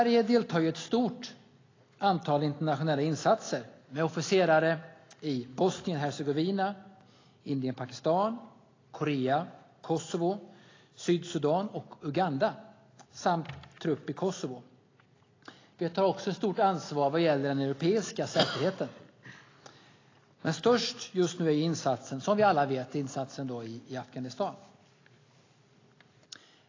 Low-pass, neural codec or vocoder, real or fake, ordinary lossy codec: 7.2 kHz; none; real; AAC, 32 kbps